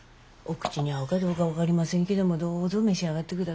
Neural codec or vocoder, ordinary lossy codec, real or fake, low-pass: none; none; real; none